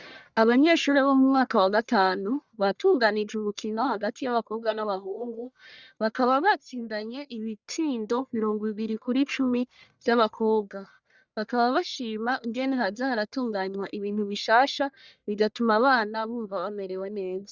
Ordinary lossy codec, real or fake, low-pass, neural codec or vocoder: Opus, 64 kbps; fake; 7.2 kHz; codec, 44.1 kHz, 1.7 kbps, Pupu-Codec